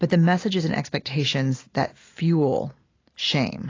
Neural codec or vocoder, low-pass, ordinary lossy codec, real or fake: none; 7.2 kHz; AAC, 32 kbps; real